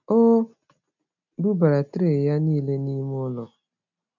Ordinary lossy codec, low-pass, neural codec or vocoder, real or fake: none; 7.2 kHz; none; real